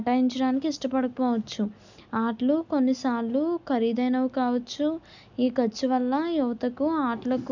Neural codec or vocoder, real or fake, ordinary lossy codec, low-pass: none; real; none; 7.2 kHz